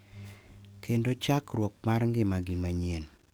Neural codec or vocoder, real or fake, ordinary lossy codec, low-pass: codec, 44.1 kHz, 7.8 kbps, DAC; fake; none; none